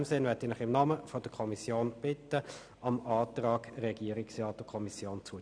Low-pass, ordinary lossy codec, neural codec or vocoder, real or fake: 9.9 kHz; none; none; real